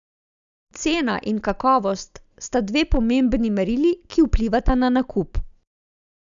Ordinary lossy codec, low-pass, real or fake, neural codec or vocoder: none; 7.2 kHz; real; none